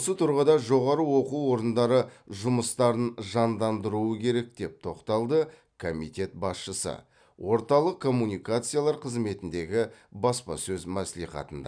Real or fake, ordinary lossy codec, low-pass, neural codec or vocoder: real; none; 9.9 kHz; none